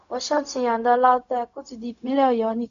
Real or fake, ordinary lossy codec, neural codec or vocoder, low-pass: fake; none; codec, 16 kHz, 0.4 kbps, LongCat-Audio-Codec; 7.2 kHz